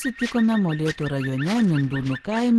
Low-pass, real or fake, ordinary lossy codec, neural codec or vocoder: 10.8 kHz; real; Opus, 16 kbps; none